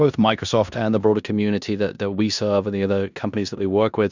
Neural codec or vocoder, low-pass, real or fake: codec, 16 kHz in and 24 kHz out, 0.9 kbps, LongCat-Audio-Codec, four codebook decoder; 7.2 kHz; fake